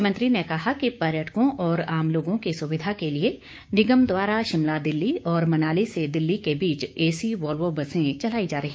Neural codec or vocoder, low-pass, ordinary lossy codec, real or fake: codec, 16 kHz, 6 kbps, DAC; none; none; fake